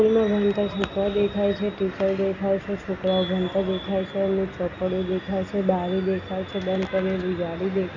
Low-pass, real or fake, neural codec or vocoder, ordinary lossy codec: 7.2 kHz; real; none; none